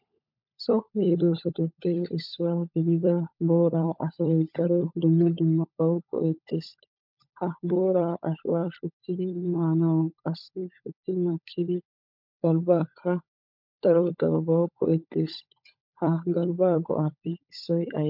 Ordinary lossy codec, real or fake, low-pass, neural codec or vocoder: MP3, 48 kbps; fake; 5.4 kHz; codec, 16 kHz, 16 kbps, FunCodec, trained on LibriTTS, 50 frames a second